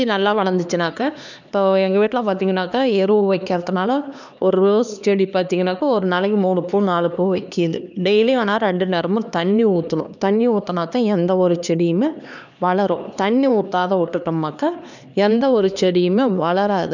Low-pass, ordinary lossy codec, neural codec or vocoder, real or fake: 7.2 kHz; none; codec, 16 kHz, 2 kbps, X-Codec, HuBERT features, trained on LibriSpeech; fake